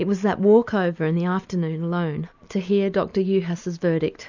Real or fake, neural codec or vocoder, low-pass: real; none; 7.2 kHz